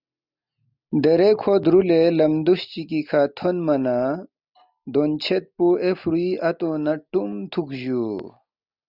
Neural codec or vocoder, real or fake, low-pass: none; real; 5.4 kHz